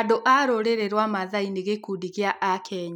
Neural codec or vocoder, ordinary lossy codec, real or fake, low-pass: none; none; real; 19.8 kHz